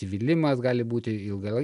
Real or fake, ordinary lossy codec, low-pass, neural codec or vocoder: real; MP3, 96 kbps; 10.8 kHz; none